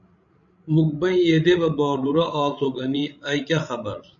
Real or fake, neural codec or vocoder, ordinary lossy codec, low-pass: fake; codec, 16 kHz, 16 kbps, FreqCodec, larger model; MP3, 96 kbps; 7.2 kHz